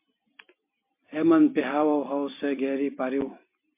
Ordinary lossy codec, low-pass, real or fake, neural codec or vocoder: MP3, 24 kbps; 3.6 kHz; fake; vocoder, 44.1 kHz, 128 mel bands every 256 samples, BigVGAN v2